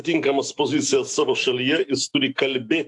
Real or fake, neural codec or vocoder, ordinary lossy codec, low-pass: fake; autoencoder, 48 kHz, 128 numbers a frame, DAC-VAE, trained on Japanese speech; AAC, 64 kbps; 10.8 kHz